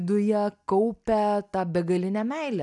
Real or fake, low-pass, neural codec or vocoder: real; 10.8 kHz; none